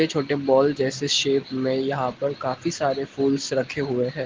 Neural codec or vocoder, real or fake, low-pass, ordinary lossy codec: none; real; 7.2 kHz; Opus, 16 kbps